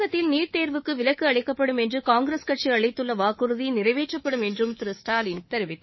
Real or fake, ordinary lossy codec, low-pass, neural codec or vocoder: fake; MP3, 24 kbps; 7.2 kHz; codec, 24 kHz, 3.1 kbps, DualCodec